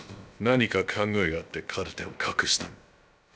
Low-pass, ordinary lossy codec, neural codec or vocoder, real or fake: none; none; codec, 16 kHz, about 1 kbps, DyCAST, with the encoder's durations; fake